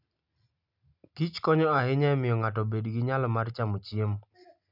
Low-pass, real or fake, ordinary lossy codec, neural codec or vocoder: 5.4 kHz; real; none; none